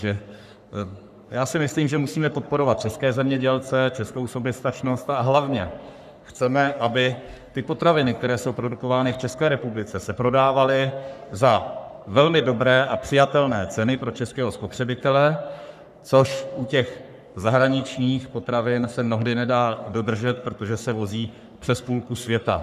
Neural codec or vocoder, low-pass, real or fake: codec, 44.1 kHz, 3.4 kbps, Pupu-Codec; 14.4 kHz; fake